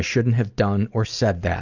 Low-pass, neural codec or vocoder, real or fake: 7.2 kHz; none; real